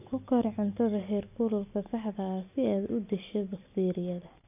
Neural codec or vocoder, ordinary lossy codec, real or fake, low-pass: none; none; real; 3.6 kHz